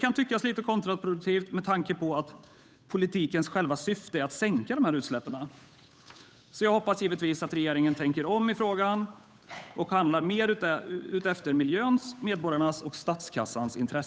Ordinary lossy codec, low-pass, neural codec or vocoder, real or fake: none; none; codec, 16 kHz, 8 kbps, FunCodec, trained on Chinese and English, 25 frames a second; fake